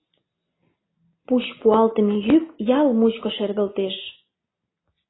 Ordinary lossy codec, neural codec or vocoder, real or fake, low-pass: AAC, 16 kbps; none; real; 7.2 kHz